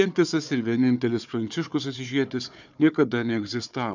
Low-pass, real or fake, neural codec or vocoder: 7.2 kHz; fake; codec, 16 kHz, 4 kbps, FunCodec, trained on Chinese and English, 50 frames a second